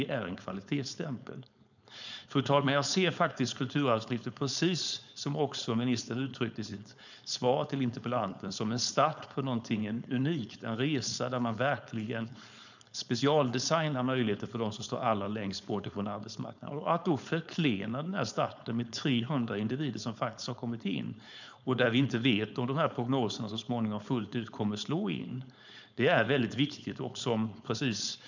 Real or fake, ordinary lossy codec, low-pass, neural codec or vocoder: fake; none; 7.2 kHz; codec, 16 kHz, 4.8 kbps, FACodec